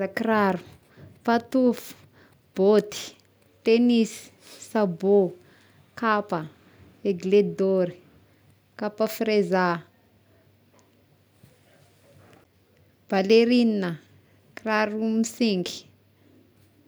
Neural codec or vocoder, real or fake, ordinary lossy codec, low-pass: none; real; none; none